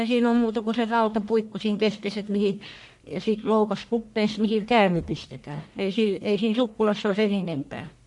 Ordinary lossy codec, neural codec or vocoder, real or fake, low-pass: MP3, 64 kbps; codec, 44.1 kHz, 1.7 kbps, Pupu-Codec; fake; 10.8 kHz